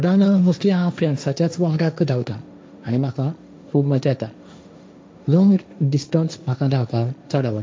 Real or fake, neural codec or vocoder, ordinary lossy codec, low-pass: fake; codec, 16 kHz, 1.1 kbps, Voila-Tokenizer; none; none